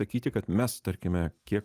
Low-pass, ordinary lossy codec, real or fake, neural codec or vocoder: 14.4 kHz; Opus, 32 kbps; real; none